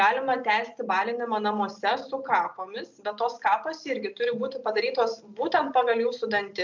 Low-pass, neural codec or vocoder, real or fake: 7.2 kHz; none; real